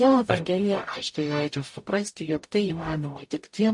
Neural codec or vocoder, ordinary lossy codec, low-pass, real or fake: codec, 44.1 kHz, 0.9 kbps, DAC; MP3, 48 kbps; 10.8 kHz; fake